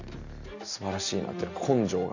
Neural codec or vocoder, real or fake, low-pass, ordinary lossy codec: none; real; 7.2 kHz; none